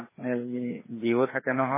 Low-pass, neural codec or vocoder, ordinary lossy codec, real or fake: 3.6 kHz; codec, 16 kHz, 2 kbps, FreqCodec, larger model; MP3, 16 kbps; fake